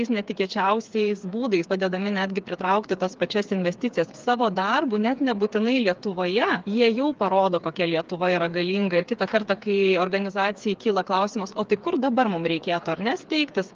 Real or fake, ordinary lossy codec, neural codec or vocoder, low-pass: fake; Opus, 32 kbps; codec, 16 kHz, 4 kbps, FreqCodec, smaller model; 7.2 kHz